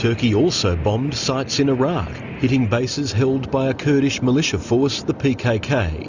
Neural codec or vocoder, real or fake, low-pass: none; real; 7.2 kHz